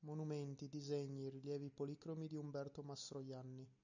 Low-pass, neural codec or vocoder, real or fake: 7.2 kHz; none; real